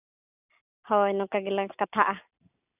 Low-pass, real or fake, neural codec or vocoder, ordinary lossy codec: 3.6 kHz; real; none; none